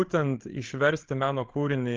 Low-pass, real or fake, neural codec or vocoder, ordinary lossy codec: 7.2 kHz; fake; codec, 16 kHz, 8 kbps, FreqCodec, larger model; Opus, 16 kbps